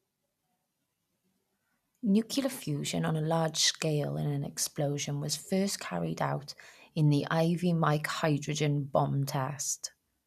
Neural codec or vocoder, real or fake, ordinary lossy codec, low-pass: none; real; none; 14.4 kHz